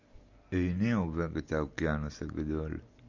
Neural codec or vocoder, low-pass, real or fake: none; 7.2 kHz; real